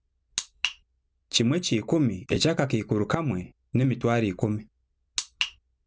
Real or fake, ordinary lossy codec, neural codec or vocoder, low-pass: real; none; none; none